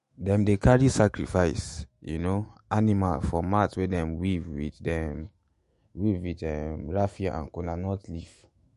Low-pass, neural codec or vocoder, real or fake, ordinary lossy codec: 14.4 kHz; autoencoder, 48 kHz, 128 numbers a frame, DAC-VAE, trained on Japanese speech; fake; MP3, 48 kbps